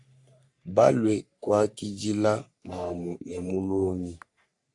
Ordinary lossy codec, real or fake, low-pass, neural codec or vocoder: AAC, 64 kbps; fake; 10.8 kHz; codec, 44.1 kHz, 3.4 kbps, Pupu-Codec